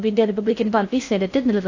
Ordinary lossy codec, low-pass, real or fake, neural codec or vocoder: AAC, 48 kbps; 7.2 kHz; fake; codec, 16 kHz in and 24 kHz out, 0.6 kbps, FocalCodec, streaming, 4096 codes